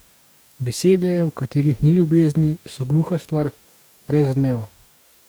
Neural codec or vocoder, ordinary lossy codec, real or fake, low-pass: codec, 44.1 kHz, 2.6 kbps, DAC; none; fake; none